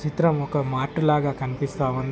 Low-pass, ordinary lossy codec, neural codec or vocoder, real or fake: none; none; none; real